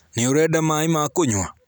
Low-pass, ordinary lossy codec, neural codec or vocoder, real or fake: none; none; none; real